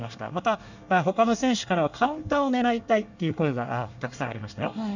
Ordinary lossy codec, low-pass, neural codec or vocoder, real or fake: none; 7.2 kHz; codec, 24 kHz, 1 kbps, SNAC; fake